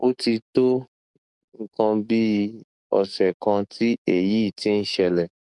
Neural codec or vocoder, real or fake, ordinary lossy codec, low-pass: codec, 44.1 kHz, 7.8 kbps, DAC; fake; none; 10.8 kHz